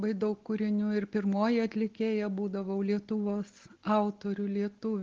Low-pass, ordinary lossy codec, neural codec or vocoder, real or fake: 7.2 kHz; Opus, 16 kbps; none; real